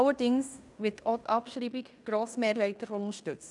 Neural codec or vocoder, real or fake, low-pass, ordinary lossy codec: codec, 16 kHz in and 24 kHz out, 0.9 kbps, LongCat-Audio-Codec, fine tuned four codebook decoder; fake; 10.8 kHz; none